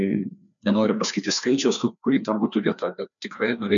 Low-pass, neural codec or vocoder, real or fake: 7.2 kHz; codec, 16 kHz, 2 kbps, FreqCodec, larger model; fake